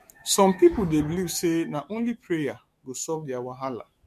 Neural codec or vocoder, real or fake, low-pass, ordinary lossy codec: autoencoder, 48 kHz, 128 numbers a frame, DAC-VAE, trained on Japanese speech; fake; 14.4 kHz; MP3, 64 kbps